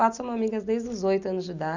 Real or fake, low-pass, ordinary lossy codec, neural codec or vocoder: real; 7.2 kHz; none; none